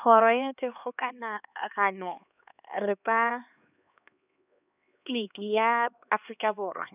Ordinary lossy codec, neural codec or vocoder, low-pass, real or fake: none; codec, 16 kHz, 2 kbps, X-Codec, HuBERT features, trained on LibriSpeech; 3.6 kHz; fake